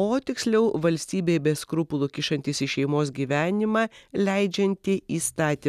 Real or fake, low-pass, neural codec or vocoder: real; 14.4 kHz; none